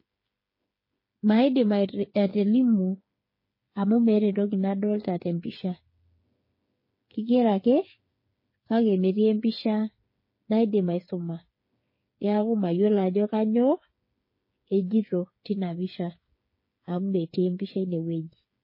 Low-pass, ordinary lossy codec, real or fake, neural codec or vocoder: 5.4 kHz; MP3, 24 kbps; fake; codec, 16 kHz, 8 kbps, FreqCodec, smaller model